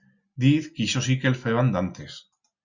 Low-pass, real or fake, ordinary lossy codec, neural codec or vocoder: 7.2 kHz; real; Opus, 64 kbps; none